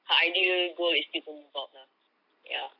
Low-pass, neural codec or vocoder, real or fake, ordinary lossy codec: 5.4 kHz; none; real; none